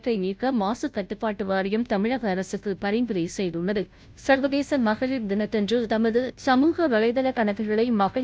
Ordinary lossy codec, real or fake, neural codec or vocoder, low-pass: none; fake; codec, 16 kHz, 0.5 kbps, FunCodec, trained on Chinese and English, 25 frames a second; none